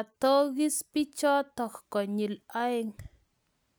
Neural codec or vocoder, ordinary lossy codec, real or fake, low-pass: none; none; real; none